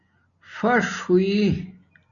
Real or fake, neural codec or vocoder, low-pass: real; none; 7.2 kHz